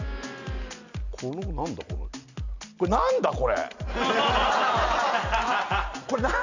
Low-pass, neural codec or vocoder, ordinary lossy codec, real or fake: 7.2 kHz; none; none; real